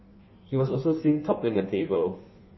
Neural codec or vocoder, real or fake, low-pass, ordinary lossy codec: codec, 16 kHz in and 24 kHz out, 1.1 kbps, FireRedTTS-2 codec; fake; 7.2 kHz; MP3, 24 kbps